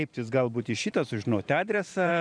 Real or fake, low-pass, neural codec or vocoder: fake; 9.9 kHz; vocoder, 44.1 kHz, 128 mel bands every 512 samples, BigVGAN v2